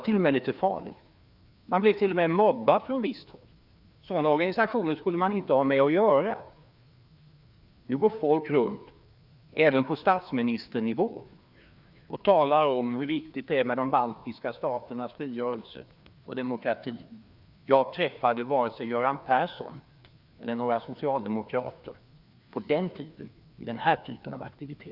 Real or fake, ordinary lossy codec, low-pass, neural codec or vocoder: fake; Opus, 64 kbps; 5.4 kHz; codec, 16 kHz, 2 kbps, FreqCodec, larger model